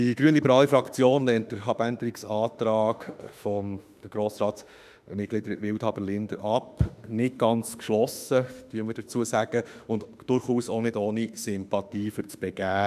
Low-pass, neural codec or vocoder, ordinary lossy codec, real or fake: 14.4 kHz; autoencoder, 48 kHz, 32 numbers a frame, DAC-VAE, trained on Japanese speech; none; fake